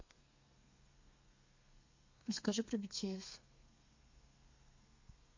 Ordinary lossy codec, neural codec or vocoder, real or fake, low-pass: MP3, 48 kbps; codec, 32 kHz, 1.9 kbps, SNAC; fake; 7.2 kHz